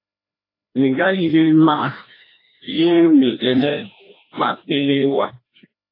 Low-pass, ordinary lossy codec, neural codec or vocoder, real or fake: 5.4 kHz; AAC, 24 kbps; codec, 16 kHz, 1 kbps, FreqCodec, larger model; fake